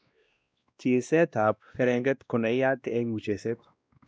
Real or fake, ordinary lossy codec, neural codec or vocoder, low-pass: fake; none; codec, 16 kHz, 1 kbps, X-Codec, WavLM features, trained on Multilingual LibriSpeech; none